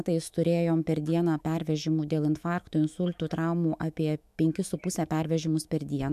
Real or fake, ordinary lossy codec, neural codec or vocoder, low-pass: fake; MP3, 96 kbps; autoencoder, 48 kHz, 128 numbers a frame, DAC-VAE, trained on Japanese speech; 14.4 kHz